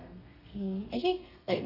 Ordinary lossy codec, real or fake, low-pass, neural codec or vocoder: none; fake; 5.4 kHz; codec, 32 kHz, 1.9 kbps, SNAC